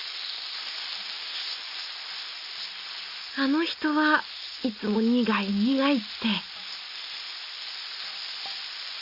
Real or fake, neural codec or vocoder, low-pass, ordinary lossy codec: real; none; 5.4 kHz; Opus, 64 kbps